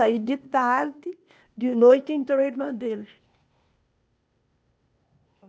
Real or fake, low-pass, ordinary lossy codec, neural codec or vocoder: fake; none; none; codec, 16 kHz, 0.8 kbps, ZipCodec